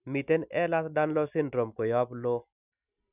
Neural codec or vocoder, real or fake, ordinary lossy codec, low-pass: none; real; none; 3.6 kHz